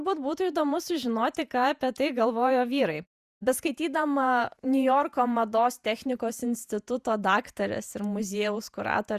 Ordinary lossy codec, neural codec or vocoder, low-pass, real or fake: Opus, 64 kbps; vocoder, 48 kHz, 128 mel bands, Vocos; 14.4 kHz; fake